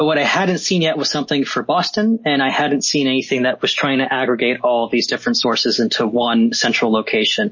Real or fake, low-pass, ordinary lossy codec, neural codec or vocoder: real; 7.2 kHz; MP3, 32 kbps; none